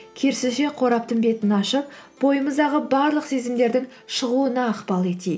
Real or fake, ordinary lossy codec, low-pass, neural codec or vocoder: real; none; none; none